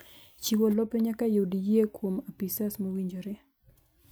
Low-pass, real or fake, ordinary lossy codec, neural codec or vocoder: none; real; none; none